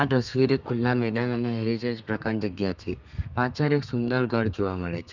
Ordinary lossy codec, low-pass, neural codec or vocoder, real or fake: none; 7.2 kHz; codec, 32 kHz, 1.9 kbps, SNAC; fake